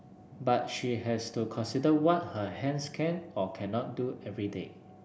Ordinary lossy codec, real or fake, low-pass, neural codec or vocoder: none; real; none; none